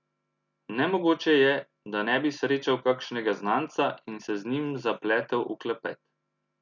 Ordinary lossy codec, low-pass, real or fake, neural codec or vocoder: none; 7.2 kHz; real; none